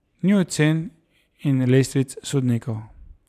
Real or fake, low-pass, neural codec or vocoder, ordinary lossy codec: fake; 14.4 kHz; vocoder, 44.1 kHz, 128 mel bands every 512 samples, BigVGAN v2; none